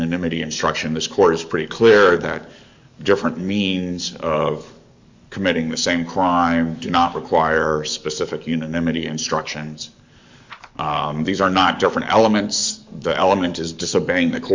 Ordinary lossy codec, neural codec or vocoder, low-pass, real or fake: MP3, 64 kbps; codec, 44.1 kHz, 7.8 kbps, DAC; 7.2 kHz; fake